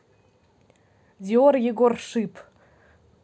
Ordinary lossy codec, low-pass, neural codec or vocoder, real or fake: none; none; none; real